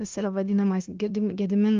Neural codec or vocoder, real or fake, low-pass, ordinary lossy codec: codec, 16 kHz, 0.9 kbps, LongCat-Audio-Codec; fake; 7.2 kHz; Opus, 24 kbps